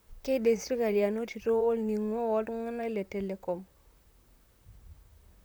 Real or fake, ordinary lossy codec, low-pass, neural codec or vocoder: fake; none; none; vocoder, 44.1 kHz, 128 mel bands, Pupu-Vocoder